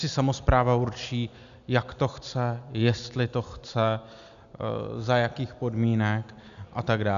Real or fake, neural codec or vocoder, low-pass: real; none; 7.2 kHz